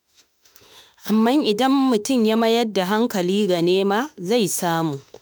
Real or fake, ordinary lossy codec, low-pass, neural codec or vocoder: fake; none; none; autoencoder, 48 kHz, 32 numbers a frame, DAC-VAE, trained on Japanese speech